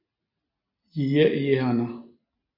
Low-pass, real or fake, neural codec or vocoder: 5.4 kHz; real; none